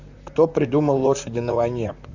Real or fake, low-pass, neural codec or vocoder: fake; 7.2 kHz; vocoder, 44.1 kHz, 128 mel bands, Pupu-Vocoder